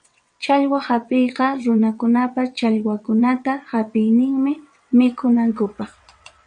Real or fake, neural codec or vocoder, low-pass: fake; vocoder, 22.05 kHz, 80 mel bands, WaveNeXt; 9.9 kHz